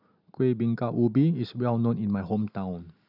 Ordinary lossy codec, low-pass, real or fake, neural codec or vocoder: none; 5.4 kHz; real; none